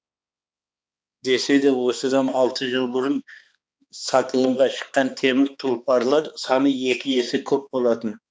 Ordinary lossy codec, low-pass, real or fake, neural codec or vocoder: none; none; fake; codec, 16 kHz, 2 kbps, X-Codec, HuBERT features, trained on balanced general audio